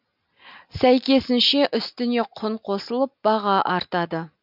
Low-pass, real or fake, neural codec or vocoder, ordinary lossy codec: 5.4 kHz; real; none; none